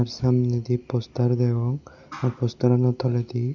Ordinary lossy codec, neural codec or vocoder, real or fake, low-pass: none; none; real; 7.2 kHz